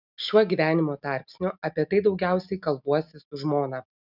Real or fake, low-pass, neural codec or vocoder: real; 5.4 kHz; none